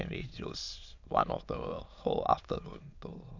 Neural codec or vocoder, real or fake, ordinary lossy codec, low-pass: autoencoder, 22.05 kHz, a latent of 192 numbers a frame, VITS, trained on many speakers; fake; none; 7.2 kHz